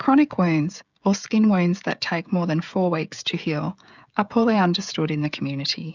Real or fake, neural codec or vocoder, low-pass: fake; codec, 16 kHz, 16 kbps, FreqCodec, smaller model; 7.2 kHz